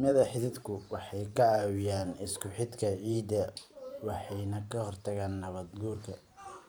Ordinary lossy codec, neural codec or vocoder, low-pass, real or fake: none; vocoder, 44.1 kHz, 128 mel bands every 512 samples, BigVGAN v2; none; fake